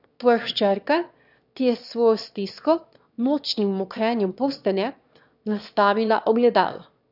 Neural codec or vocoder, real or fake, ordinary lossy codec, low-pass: autoencoder, 22.05 kHz, a latent of 192 numbers a frame, VITS, trained on one speaker; fake; none; 5.4 kHz